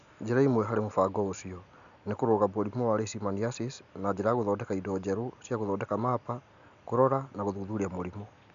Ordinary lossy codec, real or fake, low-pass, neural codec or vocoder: none; real; 7.2 kHz; none